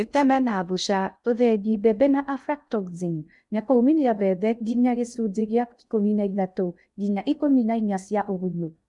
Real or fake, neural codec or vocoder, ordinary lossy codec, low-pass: fake; codec, 16 kHz in and 24 kHz out, 0.6 kbps, FocalCodec, streaming, 2048 codes; none; 10.8 kHz